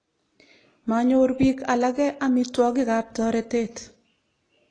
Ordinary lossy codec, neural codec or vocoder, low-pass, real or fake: AAC, 32 kbps; none; 9.9 kHz; real